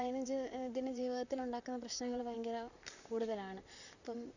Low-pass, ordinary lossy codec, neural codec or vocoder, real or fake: 7.2 kHz; none; vocoder, 44.1 kHz, 128 mel bands, Pupu-Vocoder; fake